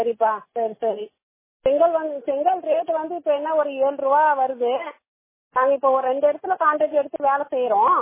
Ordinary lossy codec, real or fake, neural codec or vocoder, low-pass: MP3, 16 kbps; real; none; 3.6 kHz